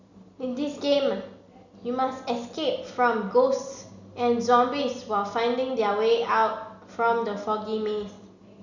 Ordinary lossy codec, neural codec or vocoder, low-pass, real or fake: none; none; 7.2 kHz; real